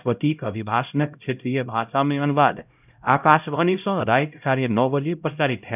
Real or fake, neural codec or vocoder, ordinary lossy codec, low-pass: fake; codec, 16 kHz, 0.5 kbps, X-Codec, HuBERT features, trained on LibriSpeech; none; 3.6 kHz